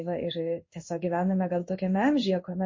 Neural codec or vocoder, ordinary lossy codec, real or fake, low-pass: codec, 16 kHz in and 24 kHz out, 1 kbps, XY-Tokenizer; MP3, 32 kbps; fake; 7.2 kHz